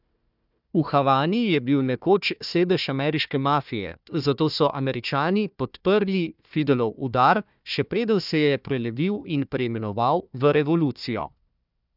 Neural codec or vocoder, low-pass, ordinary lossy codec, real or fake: codec, 16 kHz, 1 kbps, FunCodec, trained on Chinese and English, 50 frames a second; 5.4 kHz; none; fake